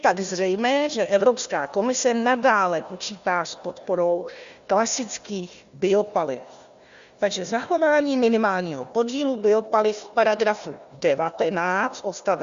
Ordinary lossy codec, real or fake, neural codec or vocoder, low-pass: Opus, 64 kbps; fake; codec, 16 kHz, 1 kbps, FunCodec, trained on Chinese and English, 50 frames a second; 7.2 kHz